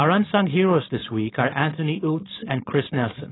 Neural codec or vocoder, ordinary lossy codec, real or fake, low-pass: codec, 16 kHz, 4.8 kbps, FACodec; AAC, 16 kbps; fake; 7.2 kHz